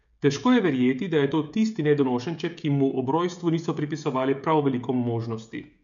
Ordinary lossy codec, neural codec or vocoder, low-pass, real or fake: none; codec, 16 kHz, 16 kbps, FreqCodec, smaller model; 7.2 kHz; fake